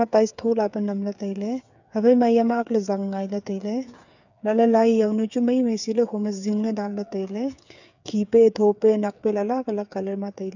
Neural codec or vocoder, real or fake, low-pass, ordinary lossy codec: codec, 16 kHz, 8 kbps, FreqCodec, smaller model; fake; 7.2 kHz; none